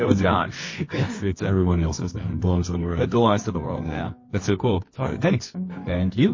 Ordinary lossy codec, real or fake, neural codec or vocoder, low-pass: MP3, 32 kbps; fake; codec, 24 kHz, 0.9 kbps, WavTokenizer, medium music audio release; 7.2 kHz